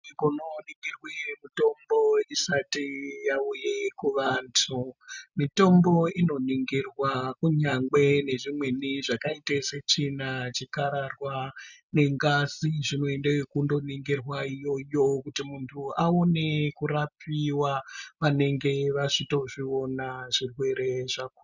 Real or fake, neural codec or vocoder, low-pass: real; none; 7.2 kHz